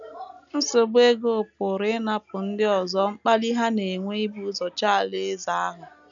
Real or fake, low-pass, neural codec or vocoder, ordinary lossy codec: real; 7.2 kHz; none; none